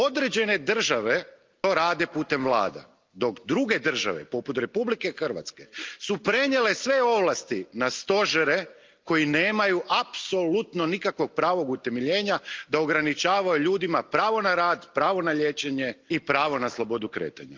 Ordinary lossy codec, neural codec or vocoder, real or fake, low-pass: Opus, 24 kbps; none; real; 7.2 kHz